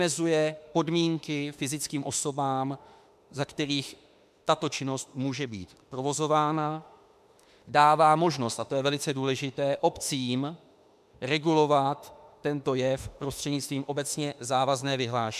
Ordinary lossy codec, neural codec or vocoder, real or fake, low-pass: MP3, 96 kbps; autoencoder, 48 kHz, 32 numbers a frame, DAC-VAE, trained on Japanese speech; fake; 14.4 kHz